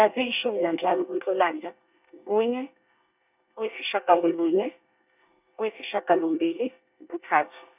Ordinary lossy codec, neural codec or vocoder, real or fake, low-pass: none; codec, 24 kHz, 1 kbps, SNAC; fake; 3.6 kHz